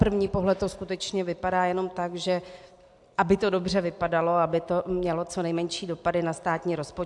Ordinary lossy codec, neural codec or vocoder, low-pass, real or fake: AAC, 64 kbps; none; 10.8 kHz; real